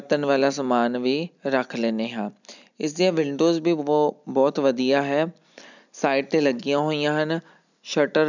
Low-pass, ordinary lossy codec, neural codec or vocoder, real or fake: 7.2 kHz; none; none; real